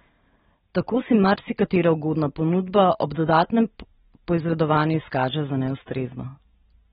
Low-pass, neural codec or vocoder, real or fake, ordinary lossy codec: 19.8 kHz; vocoder, 44.1 kHz, 128 mel bands every 512 samples, BigVGAN v2; fake; AAC, 16 kbps